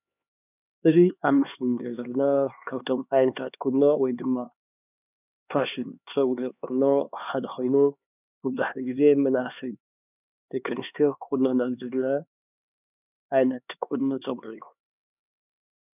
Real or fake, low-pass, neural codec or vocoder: fake; 3.6 kHz; codec, 16 kHz, 2 kbps, X-Codec, HuBERT features, trained on LibriSpeech